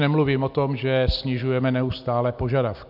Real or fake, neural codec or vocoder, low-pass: real; none; 5.4 kHz